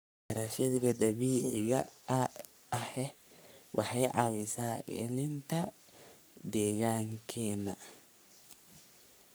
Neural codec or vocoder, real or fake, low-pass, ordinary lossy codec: codec, 44.1 kHz, 3.4 kbps, Pupu-Codec; fake; none; none